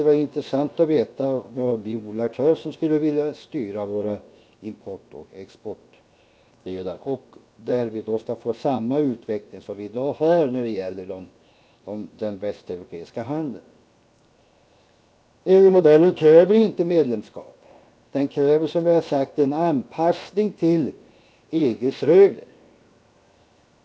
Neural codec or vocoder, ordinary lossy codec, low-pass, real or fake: codec, 16 kHz, 0.7 kbps, FocalCodec; none; none; fake